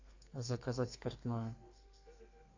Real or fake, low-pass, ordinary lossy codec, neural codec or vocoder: fake; 7.2 kHz; AAC, 48 kbps; codec, 32 kHz, 1.9 kbps, SNAC